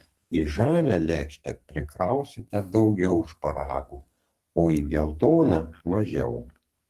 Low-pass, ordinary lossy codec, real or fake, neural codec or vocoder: 14.4 kHz; Opus, 24 kbps; fake; codec, 32 kHz, 1.9 kbps, SNAC